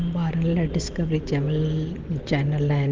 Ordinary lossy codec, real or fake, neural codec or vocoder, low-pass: Opus, 16 kbps; real; none; 7.2 kHz